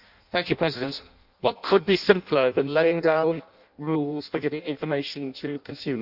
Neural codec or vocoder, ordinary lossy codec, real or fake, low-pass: codec, 16 kHz in and 24 kHz out, 0.6 kbps, FireRedTTS-2 codec; none; fake; 5.4 kHz